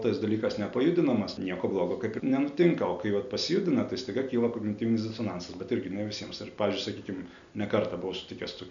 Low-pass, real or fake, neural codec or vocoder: 7.2 kHz; real; none